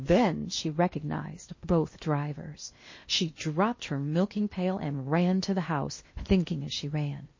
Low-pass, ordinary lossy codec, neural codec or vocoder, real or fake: 7.2 kHz; MP3, 32 kbps; codec, 16 kHz in and 24 kHz out, 0.8 kbps, FocalCodec, streaming, 65536 codes; fake